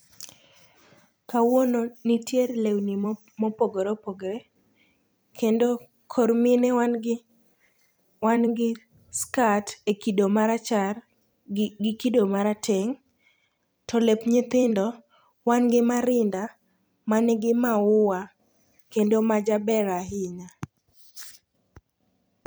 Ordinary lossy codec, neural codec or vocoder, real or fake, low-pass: none; vocoder, 44.1 kHz, 128 mel bands every 256 samples, BigVGAN v2; fake; none